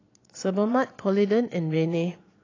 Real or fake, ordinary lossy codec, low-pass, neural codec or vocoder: fake; AAC, 32 kbps; 7.2 kHz; vocoder, 22.05 kHz, 80 mel bands, Vocos